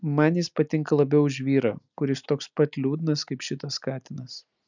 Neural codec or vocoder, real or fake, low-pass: none; real; 7.2 kHz